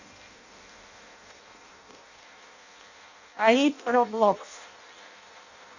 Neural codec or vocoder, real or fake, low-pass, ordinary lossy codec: codec, 16 kHz in and 24 kHz out, 0.6 kbps, FireRedTTS-2 codec; fake; 7.2 kHz; none